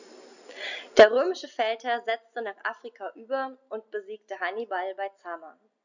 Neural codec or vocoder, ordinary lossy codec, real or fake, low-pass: none; none; real; 7.2 kHz